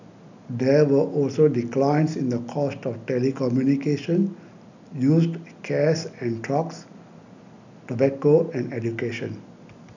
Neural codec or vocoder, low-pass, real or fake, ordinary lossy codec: none; 7.2 kHz; real; none